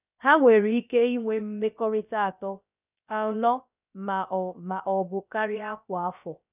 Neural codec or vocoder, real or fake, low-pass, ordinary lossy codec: codec, 16 kHz, about 1 kbps, DyCAST, with the encoder's durations; fake; 3.6 kHz; none